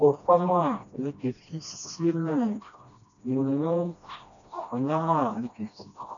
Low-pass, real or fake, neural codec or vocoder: 7.2 kHz; fake; codec, 16 kHz, 1 kbps, FreqCodec, smaller model